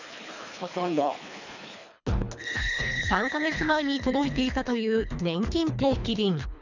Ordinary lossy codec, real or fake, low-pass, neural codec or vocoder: none; fake; 7.2 kHz; codec, 24 kHz, 3 kbps, HILCodec